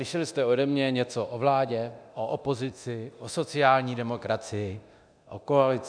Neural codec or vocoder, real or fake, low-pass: codec, 24 kHz, 0.9 kbps, DualCodec; fake; 9.9 kHz